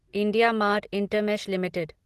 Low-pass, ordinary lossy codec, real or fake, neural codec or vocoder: 14.4 kHz; Opus, 16 kbps; real; none